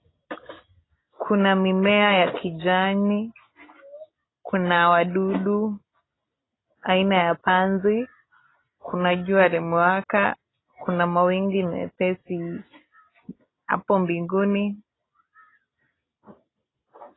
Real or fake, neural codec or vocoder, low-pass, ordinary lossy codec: real; none; 7.2 kHz; AAC, 16 kbps